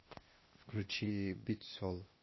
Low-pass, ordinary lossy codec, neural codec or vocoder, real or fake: 7.2 kHz; MP3, 24 kbps; codec, 16 kHz, 0.8 kbps, ZipCodec; fake